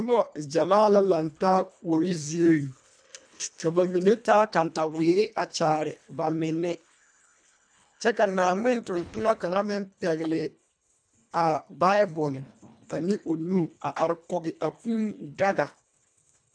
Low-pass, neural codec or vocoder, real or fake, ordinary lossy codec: 9.9 kHz; codec, 24 kHz, 1.5 kbps, HILCodec; fake; MP3, 96 kbps